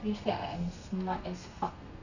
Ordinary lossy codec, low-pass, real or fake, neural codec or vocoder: none; 7.2 kHz; fake; codec, 32 kHz, 1.9 kbps, SNAC